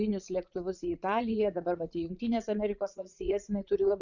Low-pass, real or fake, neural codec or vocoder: 7.2 kHz; fake; vocoder, 44.1 kHz, 80 mel bands, Vocos